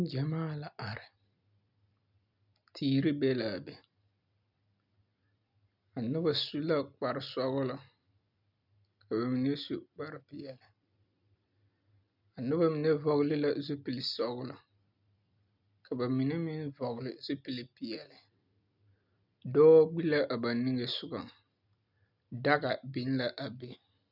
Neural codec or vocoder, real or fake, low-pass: none; real; 5.4 kHz